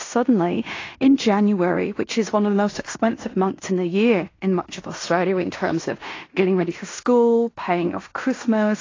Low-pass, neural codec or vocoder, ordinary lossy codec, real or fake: 7.2 kHz; codec, 16 kHz in and 24 kHz out, 0.9 kbps, LongCat-Audio-Codec, fine tuned four codebook decoder; AAC, 32 kbps; fake